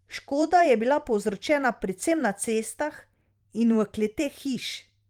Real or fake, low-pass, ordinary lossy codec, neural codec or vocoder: fake; 19.8 kHz; Opus, 32 kbps; vocoder, 44.1 kHz, 128 mel bands every 512 samples, BigVGAN v2